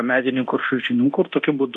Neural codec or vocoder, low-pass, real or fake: codec, 24 kHz, 0.9 kbps, DualCodec; 10.8 kHz; fake